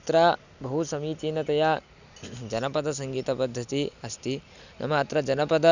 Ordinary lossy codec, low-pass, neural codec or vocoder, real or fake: none; 7.2 kHz; none; real